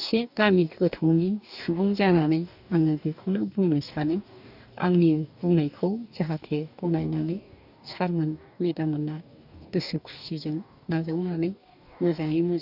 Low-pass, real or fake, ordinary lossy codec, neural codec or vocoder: 5.4 kHz; fake; none; codec, 44.1 kHz, 2.6 kbps, DAC